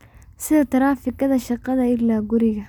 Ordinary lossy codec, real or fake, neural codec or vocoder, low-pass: none; real; none; 19.8 kHz